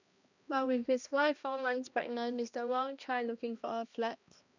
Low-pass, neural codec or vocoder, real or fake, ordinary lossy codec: 7.2 kHz; codec, 16 kHz, 1 kbps, X-Codec, HuBERT features, trained on balanced general audio; fake; none